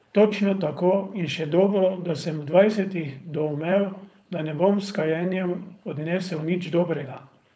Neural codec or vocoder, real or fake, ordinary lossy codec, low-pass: codec, 16 kHz, 4.8 kbps, FACodec; fake; none; none